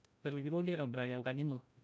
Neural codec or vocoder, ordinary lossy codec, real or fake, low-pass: codec, 16 kHz, 0.5 kbps, FreqCodec, larger model; none; fake; none